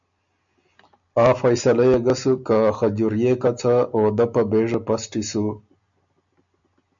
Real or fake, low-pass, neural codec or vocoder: real; 7.2 kHz; none